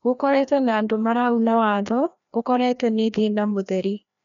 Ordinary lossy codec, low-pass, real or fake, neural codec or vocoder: MP3, 96 kbps; 7.2 kHz; fake; codec, 16 kHz, 1 kbps, FreqCodec, larger model